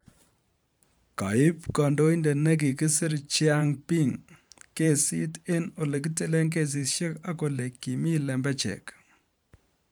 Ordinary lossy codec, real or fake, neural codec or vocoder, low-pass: none; fake; vocoder, 44.1 kHz, 128 mel bands every 512 samples, BigVGAN v2; none